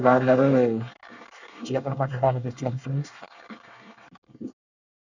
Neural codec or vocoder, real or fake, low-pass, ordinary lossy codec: codec, 24 kHz, 1 kbps, SNAC; fake; 7.2 kHz; none